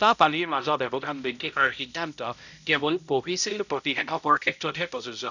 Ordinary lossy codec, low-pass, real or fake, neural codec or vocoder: none; 7.2 kHz; fake; codec, 16 kHz, 0.5 kbps, X-Codec, HuBERT features, trained on balanced general audio